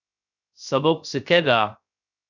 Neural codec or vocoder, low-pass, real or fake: codec, 16 kHz, 0.3 kbps, FocalCodec; 7.2 kHz; fake